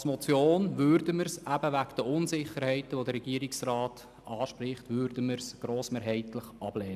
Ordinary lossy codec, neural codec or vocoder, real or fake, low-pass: none; none; real; 14.4 kHz